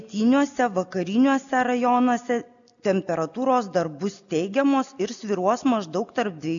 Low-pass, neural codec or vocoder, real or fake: 7.2 kHz; none; real